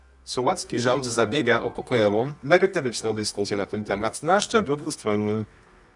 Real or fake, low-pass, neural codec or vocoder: fake; 10.8 kHz; codec, 24 kHz, 0.9 kbps, WavTokenizer, medium music audio release